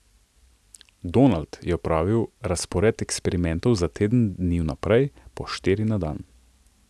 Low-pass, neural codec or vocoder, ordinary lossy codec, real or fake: none; none; none; real